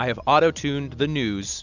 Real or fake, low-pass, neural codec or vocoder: real; 7.2 kHz; none